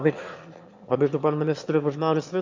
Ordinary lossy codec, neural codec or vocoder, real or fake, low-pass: MP3, 48 kbps; autoencoder, 22.05 kHz, a latent of 192 numbers a frame, VITS, trained on one speaker; fake; 7.2 kHz